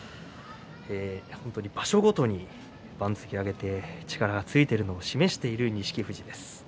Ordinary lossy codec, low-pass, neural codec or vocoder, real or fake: none; none; none; real